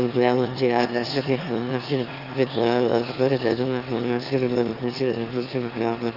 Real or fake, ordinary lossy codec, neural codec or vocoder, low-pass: fake; Opus, 32 kbps; autoencoder, 22.05 kHz, a latent of 192 numbers a frame, VITS, trained on one speaker; 5.4 kHz